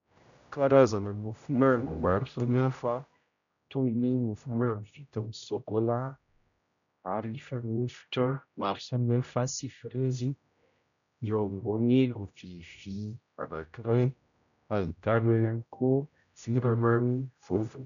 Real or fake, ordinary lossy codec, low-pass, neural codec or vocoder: fake; MP3, 96 kbps; 7.2 kHz; codec, 16 kHz, 0.5 kbps, X-Codec, HuBERT features, trained on general audio